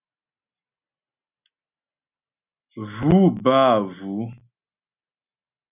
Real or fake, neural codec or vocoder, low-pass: real; none; 3.6 kHz